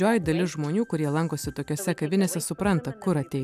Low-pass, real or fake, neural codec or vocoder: 14.4 kHz; real; none